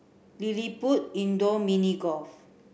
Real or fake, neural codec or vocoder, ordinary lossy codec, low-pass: real; none; none; none